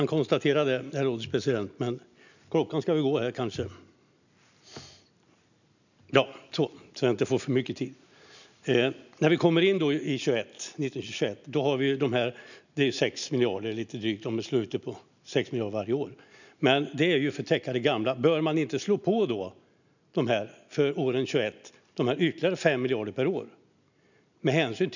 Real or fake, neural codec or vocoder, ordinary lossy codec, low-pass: real; none; none; 7.2 kHz